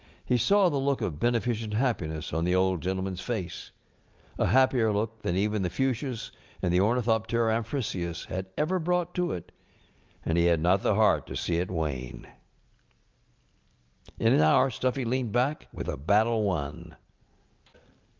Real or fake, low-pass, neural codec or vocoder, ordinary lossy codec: real; 7.2 kHz; none; Opus, 24 kbps